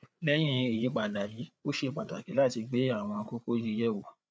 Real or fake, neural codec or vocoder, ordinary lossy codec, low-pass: fake; codec, 16 kHz, 8 kbps, FreqCodec, larger model; none; none